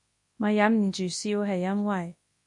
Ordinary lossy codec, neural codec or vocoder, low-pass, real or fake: MP3, 48 kbps; codec, 24 kHz, 0.9 kbps, WavTokenizer, large speech release; 10.8 kHz; fake